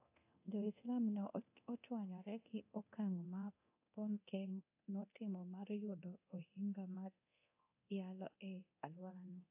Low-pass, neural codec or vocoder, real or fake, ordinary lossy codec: 3.6 kHz; codec, 24 kHz, 0.9 kbps, DualCodec; fake; AAC, 32 kbps